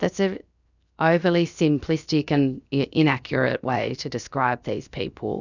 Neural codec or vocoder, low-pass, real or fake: codec, 16 kHz, 0.7 kbps, FocalCodec; 7.2 kHz; fake